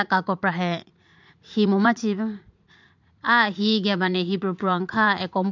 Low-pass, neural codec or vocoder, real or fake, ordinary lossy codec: 7.2 kHz; vocoder, 44.1 kHz, 80 mel bands, Vocos; fake; MP3, 64 kbps